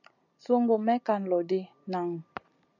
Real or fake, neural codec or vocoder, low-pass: real; none; 7.2 kHz